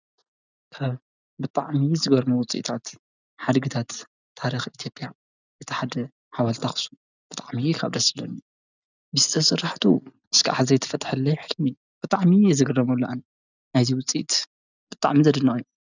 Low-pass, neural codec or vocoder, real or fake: 7.2 kHz; none; real